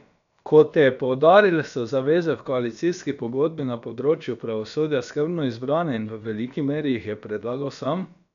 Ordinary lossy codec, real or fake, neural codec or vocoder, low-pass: none; fake; codec, 16 kHz, about 1 kbps, DyCAST, with the encoder's durations; 7.2 kHz